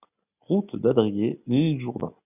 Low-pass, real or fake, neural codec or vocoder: 3.6 kHz; real; none